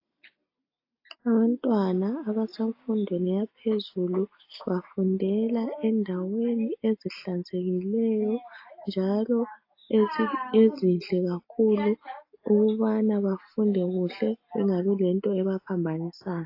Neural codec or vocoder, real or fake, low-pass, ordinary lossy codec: none; real; 5.4 kHz; AAC, 32 kbps